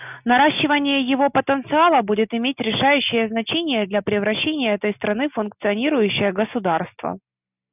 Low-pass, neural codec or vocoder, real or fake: 3.6 kHz; none; real